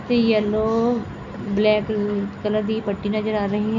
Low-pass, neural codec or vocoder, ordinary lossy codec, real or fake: 7.2 kHz; none; none; real